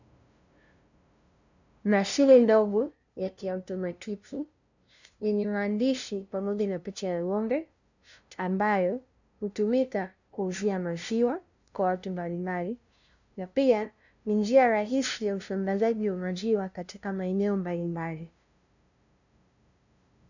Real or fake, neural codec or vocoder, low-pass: fake; codec, 16 kHz, 0.5 kbps, FunCodec, trained on LibriTTS, 25 frames a second; 7.2 kHz